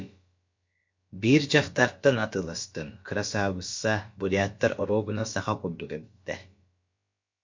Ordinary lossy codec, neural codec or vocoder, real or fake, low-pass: MP3, 48 kbps; codec, 16 kHz, about 1 kbps, DyCAST, with the encoder's durations; fake; 7.2 kHz